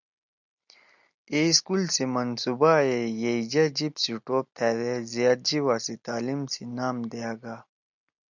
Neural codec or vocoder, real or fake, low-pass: none; real; 7.2 kHz